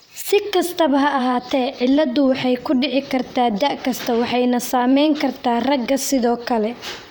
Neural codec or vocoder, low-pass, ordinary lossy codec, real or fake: vocoder, 44.1 kHz, 128 mel bands, Pupu-Vocoder; none; none; fake